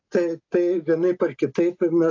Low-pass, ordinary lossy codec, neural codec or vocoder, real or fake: 7.2 kHz; AAC, 48 kbps; none; real